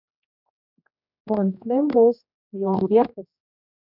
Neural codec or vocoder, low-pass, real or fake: codec, 16 kHz, 2 kbps, X-Codec, HuBERT features, trained on general audio; 5.4 kHz; fake